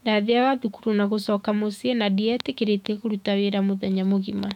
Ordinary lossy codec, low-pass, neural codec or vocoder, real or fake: none; 19.8 kHz; autoencoder, 48 kHz, 128 numbers a frame, DAC-VAE, trained on Japanese speech; fake